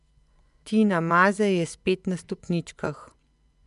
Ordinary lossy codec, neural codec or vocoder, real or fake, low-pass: MP3, 96 kbps; vocoder, 24 kHz, 100 mel bands, Vocos; fake; 10.8 kHz